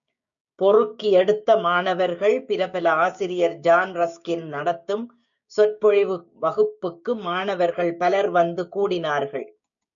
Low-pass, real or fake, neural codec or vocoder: 7.2 kHz; fake; codec, 16 kHz, 6 kbps, DAC